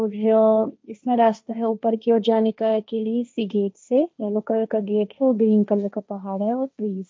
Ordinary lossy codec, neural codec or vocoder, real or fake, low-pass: none; codec, 16 kHz, 1.1 kbps, Voila-Tokenizer; fake; none